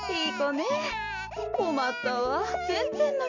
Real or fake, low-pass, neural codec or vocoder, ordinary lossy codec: real; 7.2 kHz; none; none